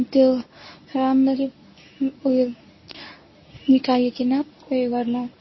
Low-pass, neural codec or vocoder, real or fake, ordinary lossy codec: 7.2 kHz; codec, 24 kHz, 0.9 kbps, WavTokenizer, medium speech release version 1; fake; MP3, 24 kbps